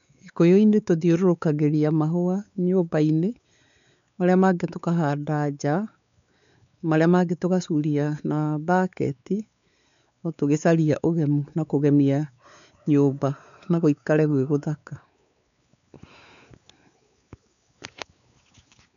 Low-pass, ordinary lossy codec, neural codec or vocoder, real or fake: 7.2 kHz; none; codec, 16 kHz, 4 kbps, X-Codec, WavLM features, trained on Multilingual LibriSpeech; fake